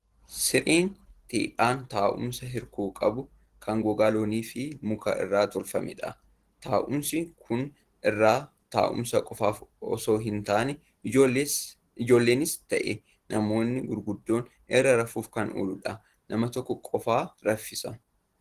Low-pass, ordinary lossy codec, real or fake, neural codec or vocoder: 14.4 kHz; Opus, 16 kbps; real; none